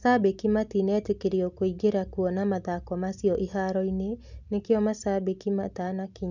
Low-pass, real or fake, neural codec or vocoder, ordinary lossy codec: 7.2 kHz; real; none; none